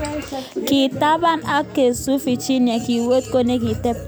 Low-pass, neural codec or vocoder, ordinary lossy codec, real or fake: none; none; none; real